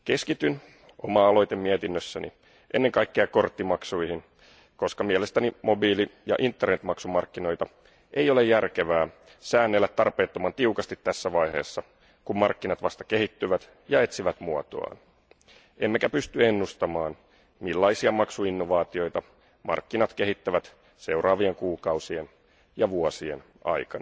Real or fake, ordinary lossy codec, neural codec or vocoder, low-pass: real; none; none; none